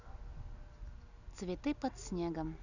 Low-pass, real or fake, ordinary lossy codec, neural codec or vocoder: 7.2 kHz; real; none; none